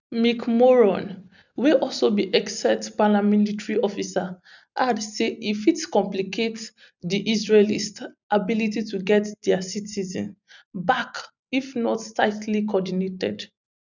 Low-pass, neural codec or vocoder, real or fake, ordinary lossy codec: 7.2 kHz; none; real; none